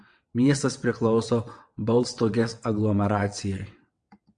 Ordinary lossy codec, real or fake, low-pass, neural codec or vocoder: MP3, 64 kbps; fake; 9.9 kHz; vocoder, 22.05 kHz, 80 mel bands, WaveNeXt